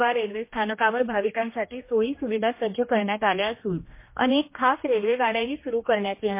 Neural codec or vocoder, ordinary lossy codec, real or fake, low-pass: codec, 16 kHz, 1 kbps, X-Codec, HuBERT features, trained on general audio; MP3, 24 kbps; fake; 3.6 kHz